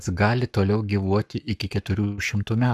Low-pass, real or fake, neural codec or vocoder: 14.4 kHz; fake; codec, 44.1 kHz, 7.8 kbps, Pupu-Codec